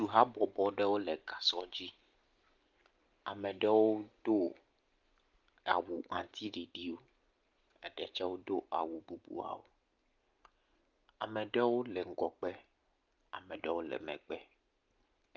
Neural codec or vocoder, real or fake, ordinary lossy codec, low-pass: none; real; Opus, 24 kbps; 7.2 kHz